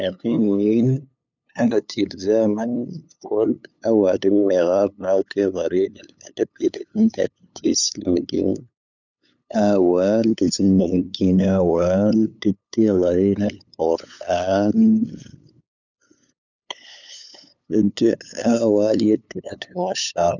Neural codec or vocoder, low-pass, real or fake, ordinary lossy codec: codec, 16 kHz, 8 kbps, FunCodec, trained on LibriTTS, 25 frames a second; 7.2 kHz; fake; none